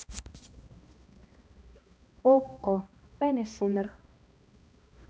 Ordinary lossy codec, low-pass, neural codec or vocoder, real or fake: none; none; codec, 16 kHz, 1 kbps, X-Codec, HuBERT features, trained on balanced general audio; fake